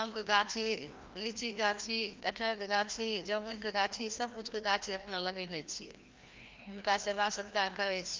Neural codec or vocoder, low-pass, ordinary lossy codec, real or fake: codec, 16 kHz, 1 kbps, FreqCodec, larger model; 7.2 kHz; Opus, 24 kbps; fake